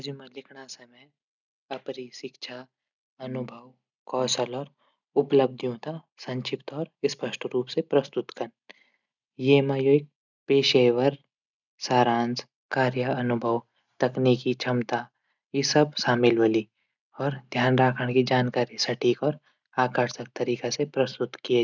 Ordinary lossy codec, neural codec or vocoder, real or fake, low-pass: none; none; real; 7.2 kHz